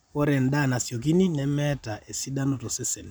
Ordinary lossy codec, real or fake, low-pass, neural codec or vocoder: none; fake; none; vocoder, 44.1 kHz, 128 mel bands every 512 samples, BigVGAN v2